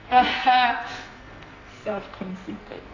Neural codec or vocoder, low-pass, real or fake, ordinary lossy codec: codec, 44.1 kHz, 2.6 kbps, SNAC; 7.2 kHz; fake; AAC, 32 kbps